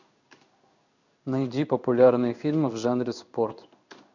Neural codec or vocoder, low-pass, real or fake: codec, 16 kHz in and 24 kHz out, 1 kbps, XY-Tokenizer; 7.2 kHz; fake